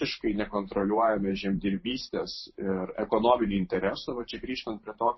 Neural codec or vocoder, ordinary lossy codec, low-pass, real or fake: vocoder, 44.1 kHz, 128 mel bands every 512 samples, BigVGAN v2; MP3, 24 kbps; 7.2 kHz; fake